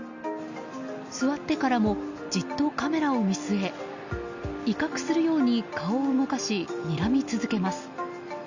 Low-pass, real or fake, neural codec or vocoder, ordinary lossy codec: 7.2 kHz; real; none; Opus, 64 kbps